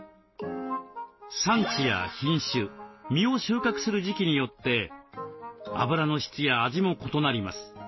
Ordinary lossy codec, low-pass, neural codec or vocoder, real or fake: MP3, 24 kbps; 7.2 kHz; none; real